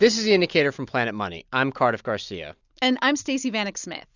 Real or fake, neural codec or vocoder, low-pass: real; none; 7.2 kHz